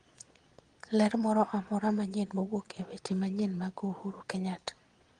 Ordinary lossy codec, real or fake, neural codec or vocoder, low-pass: Opus, 24 kbps; fake; vocoder, 22.05 kHz, 80 mel bands, Vocos; 9.9 kHz